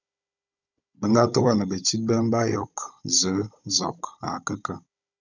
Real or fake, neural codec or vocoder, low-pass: fake; codec, 16 kHz, 16 kbps, FunCodec, trained on Chinese and English, 50 frames a second; 7.2 kHz